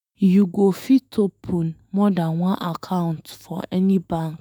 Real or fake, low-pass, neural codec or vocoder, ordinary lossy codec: fake; none; autoencoder, 48 kHz, 128 numbers a frame, DAC-VAE, trained on Japanese speech; none